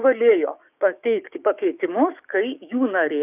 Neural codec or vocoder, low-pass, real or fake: codec, 16 kHz, 6 kbps, DAC; 3.6 kHz; fake